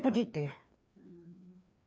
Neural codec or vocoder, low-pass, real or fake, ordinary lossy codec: codec, 16 kHz, 2 kbps, FreqCodec, larger model; none; fake; none